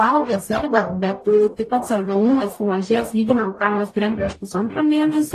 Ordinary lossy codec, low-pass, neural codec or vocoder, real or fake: AAC, 48 kbps; 14.4 kHz; codec, 44.1 kHz, 0.9 kbps, DAC; fake